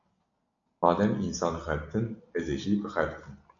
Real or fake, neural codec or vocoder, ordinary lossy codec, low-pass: real; none; AAC, 48 kbps; 7.2 kHz